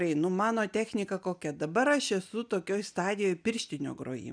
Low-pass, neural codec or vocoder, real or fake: 9.9 kHz; none; real